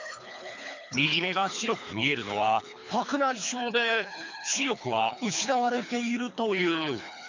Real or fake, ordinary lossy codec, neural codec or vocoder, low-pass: fake; MP3, 48 kbps; codec, 24 kHz, 3 kbps, HILCodec; 7.2 kHz